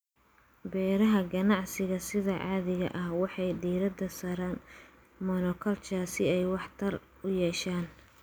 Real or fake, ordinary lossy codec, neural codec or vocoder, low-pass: real; none; none; none